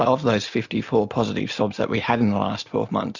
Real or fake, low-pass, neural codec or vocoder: real; 7.2 kHz; none